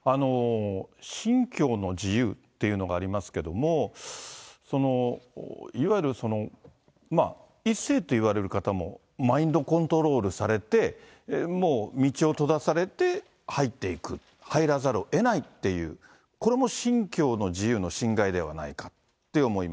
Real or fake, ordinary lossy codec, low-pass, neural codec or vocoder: real; none; none; none